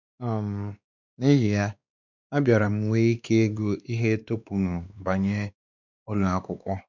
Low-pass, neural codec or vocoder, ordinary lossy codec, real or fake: 7.2 kHz; codec, 16 kHz, 2 kbps, X-Codec, WavLM features, trained on Multilingual LibriSpeech; none; fake